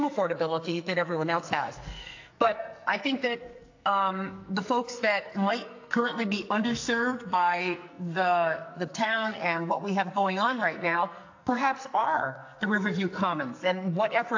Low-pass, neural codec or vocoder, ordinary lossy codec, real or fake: 7.2 kHz; codec, 44.1 kHz, 2.6 kbps, SNAC; AAC, 48 kbps; fake